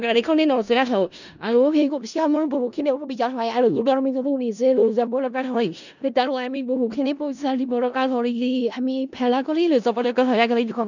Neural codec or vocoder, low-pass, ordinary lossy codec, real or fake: codec, 16 kHz in and 24 kHz out, 0.4 kbps, LongCat-Audio-Codec, four codebook decoder; 7.2 kHz; none; fake